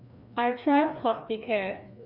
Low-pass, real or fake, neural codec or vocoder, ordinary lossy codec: 5.4 kHz; fake; codec, 16 kHz, 2 kbps, FreqCodec, larger model; none